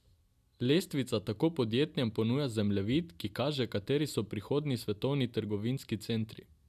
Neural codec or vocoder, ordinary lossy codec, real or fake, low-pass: none; none; real; 14.4 kHz